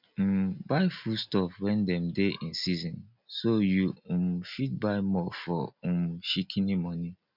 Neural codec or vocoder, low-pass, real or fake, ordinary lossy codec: none; 5.4 kHz; real; none